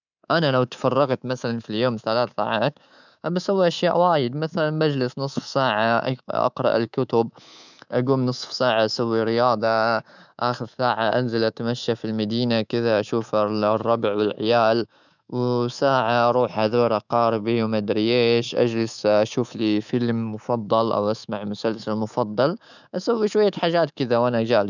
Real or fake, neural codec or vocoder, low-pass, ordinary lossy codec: fake; codec, 24 kHz, 3.1 kbps, DualCodec; 7.2 kHz; none